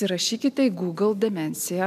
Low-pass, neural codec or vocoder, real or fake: 14.4 kHz; none; real